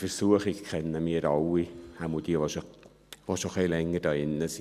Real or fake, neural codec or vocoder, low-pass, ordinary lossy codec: real; none; 14.4 kHz; none